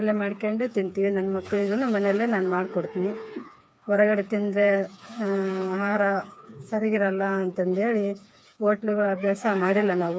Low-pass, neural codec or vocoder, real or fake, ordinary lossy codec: none; codec, 16 kHz, 4 kbps, FreqCodec, smaller model; fake; none